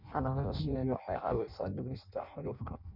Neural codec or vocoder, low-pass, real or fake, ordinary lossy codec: codec, 16 kHz in and 24 kHz out, 0.6 kbps, FireRedTTS-2 codec; 5.4 kHz; fake; Opus, 64 kbps